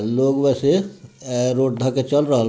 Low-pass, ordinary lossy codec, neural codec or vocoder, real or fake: none; none; none; real